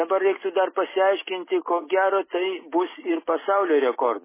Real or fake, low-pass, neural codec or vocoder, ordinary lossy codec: real; 3.6 kHz; none; MP3, 16 kbps